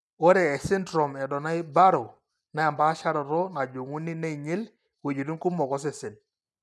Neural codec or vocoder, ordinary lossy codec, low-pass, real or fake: none; none; none; real